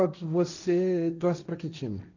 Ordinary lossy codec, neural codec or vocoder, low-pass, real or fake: none; codec, 16 kHz, 1.1 kbps, Voila-Tokenizer; 7.2 kHz; fake